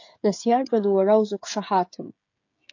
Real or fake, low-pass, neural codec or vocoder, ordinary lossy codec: fake; 7.2 kHz; codec, 16 kHz, 16 kbps, FreqCodec, smaller model; AAC, 48 kbps